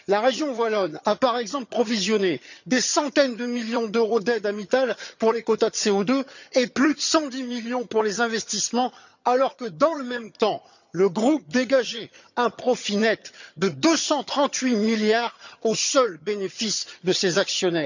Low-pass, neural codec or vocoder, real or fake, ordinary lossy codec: 7.2 kHz; vocoder, 22.05 kHz, 80 mel bands, HiFi-GAN; fake; none